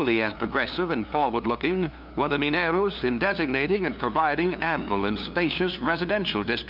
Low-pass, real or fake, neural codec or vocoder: 5.4 kHz; fake; codec, 16 kHz, 2 kbps, FunCodec, trained on LibriTTS, 25 frames a second